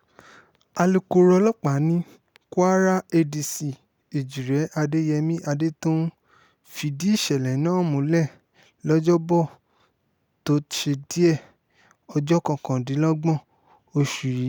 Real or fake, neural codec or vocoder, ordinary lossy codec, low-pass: real; none; none; none